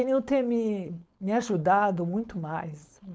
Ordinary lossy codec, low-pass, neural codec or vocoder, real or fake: none; none; codec, 16 kHz, 4.8 kbps, FACodec; fake